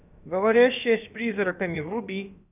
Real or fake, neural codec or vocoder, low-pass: fake; codec, 16 kHz, about 1 kbps, DyCAST, with the encoder's durations; 3.6 kHz